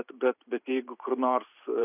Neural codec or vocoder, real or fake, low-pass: vocoder, 44.1 kHz, 128 mel bands every 512 samples, BigVGAN v2; fake; 3.6 kHz